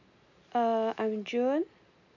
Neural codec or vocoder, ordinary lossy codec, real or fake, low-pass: none; none; real; 7.2 kHz